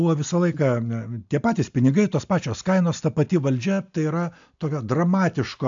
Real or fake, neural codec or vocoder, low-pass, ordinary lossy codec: real; none; 7.2 kHz; AAC, 64 kbps